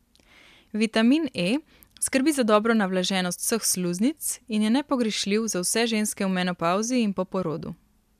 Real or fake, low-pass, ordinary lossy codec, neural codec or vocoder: real; 14.4 kHz; MP3, 96 kbps; none